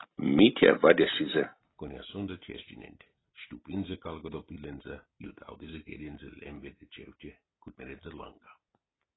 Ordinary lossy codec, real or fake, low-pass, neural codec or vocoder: AAC, 16 kbps; real; 7.2 kHz; none